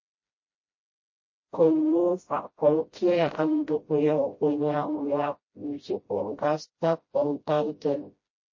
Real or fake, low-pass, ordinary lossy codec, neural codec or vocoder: fake; 7.2 kHz; MP3, 32 kbps; codec, 16 kHz, 0.5 kbps, FreqCodec, smaller model